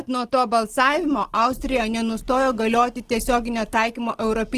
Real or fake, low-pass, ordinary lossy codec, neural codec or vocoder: real; 14.4 kHz; Opus, 16 kbps; none